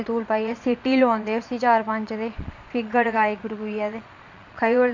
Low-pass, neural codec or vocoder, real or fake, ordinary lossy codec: 7.2 kHz; vocoder, 22.05 kHz, 80 mel bands, Vocos; fake; MP3, 48 kbps